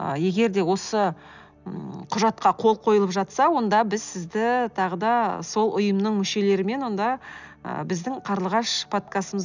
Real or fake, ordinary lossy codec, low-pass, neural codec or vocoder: real; none; 7.2 kHz; none